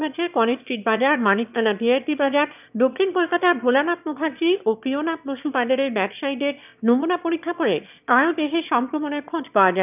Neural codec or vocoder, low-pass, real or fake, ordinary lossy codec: autoencoder, 22.05 kHz, a latent of 192 numbers a frame, VITS, trained on one speaker; 3.6 kHz; fake; none